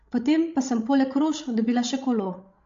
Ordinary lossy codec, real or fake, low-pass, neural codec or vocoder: MP3, 48 kbps; fake; 7.2 kHz; codec, 16 kHz, 16 kbps, FreqCodec, larger model